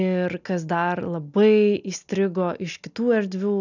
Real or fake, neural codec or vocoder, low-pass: real; none; 7.2 kHz